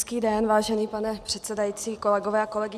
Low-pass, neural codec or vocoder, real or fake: 14.4 kHz; none; real